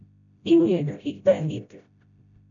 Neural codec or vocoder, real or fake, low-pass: codec, 16 kHz, 0.5 kbps, FreqCodec, smaller model; fake; 7.2 kHz